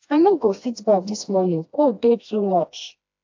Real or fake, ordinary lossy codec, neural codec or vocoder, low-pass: fake; AAC, 48 kbps; codec, 16 kHz, 1 kbps, FreqCodec, smaller model; 7.2 kHz